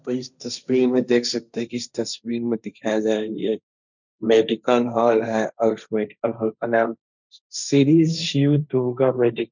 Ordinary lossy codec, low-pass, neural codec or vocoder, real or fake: none; 7.2 kHz; codec, 16 kHz, 1.1 kbps, Voila-Tokenizer; fake